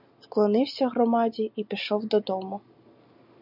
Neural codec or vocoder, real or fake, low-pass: none; real; 5.4 kHz